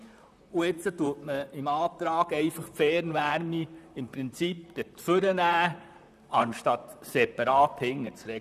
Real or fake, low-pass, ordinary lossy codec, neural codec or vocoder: fake; 14.4 kHz; none; vocoder, 44.1 kHz, 128 mel bands, Pupu-Vocoder